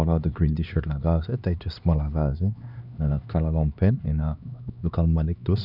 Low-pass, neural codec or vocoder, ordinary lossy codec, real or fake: 5.4 kHz; codec, 16 kHz, 2 kbps, X-Codec, HuBERT features, trained on LibriSpeech; none; fake